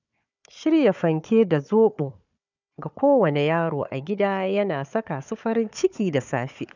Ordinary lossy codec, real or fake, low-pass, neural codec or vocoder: none; fake; 7.2 kHz; codec, 16 kHz, 4 kbps, FunCodec, trained on Chinese and English, 50 frames a second